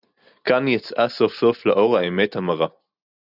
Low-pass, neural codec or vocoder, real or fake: 5.4 kHz; none; real